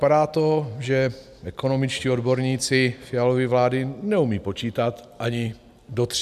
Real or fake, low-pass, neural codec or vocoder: real; 14.4 kHz; none